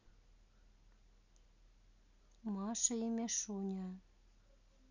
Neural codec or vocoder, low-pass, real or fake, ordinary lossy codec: none; 7.2 kHz; real; none